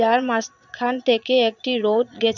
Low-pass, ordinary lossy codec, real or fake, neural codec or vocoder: 7.2 kHz; none; fake; vocoder, 22.05 kHz, 80 mel bands, Vocos